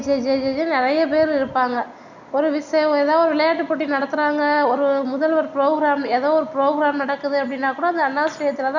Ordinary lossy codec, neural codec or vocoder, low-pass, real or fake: none; none; 7.2 kHz; real